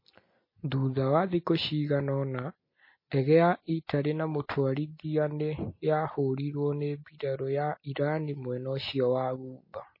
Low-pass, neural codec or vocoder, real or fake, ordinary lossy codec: 5.4 kHz; none; real; MP3, 24 kbps